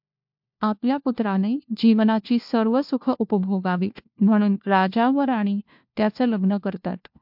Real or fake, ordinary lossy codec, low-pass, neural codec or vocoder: fake; MP3, 48 kbps; 5.4 kHz; codec, 16 kHz, 1 kbps, FunCodec, trained on LibriTTS, 50 frames a second